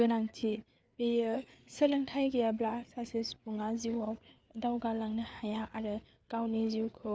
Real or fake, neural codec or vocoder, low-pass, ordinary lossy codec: fake; codec, 16 kHz, 4 kbps, FreqCodec, larger model; none; none